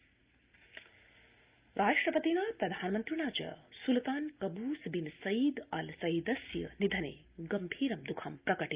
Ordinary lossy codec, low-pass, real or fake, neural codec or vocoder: Opus, 32 kbps; 3.6 kHz; real; none